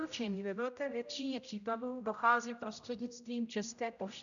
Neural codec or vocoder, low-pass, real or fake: codec, 16 kHz, 0.5 kbps, X-Codec, HuBERT features, trained on general audio; 7.2 kHz; fake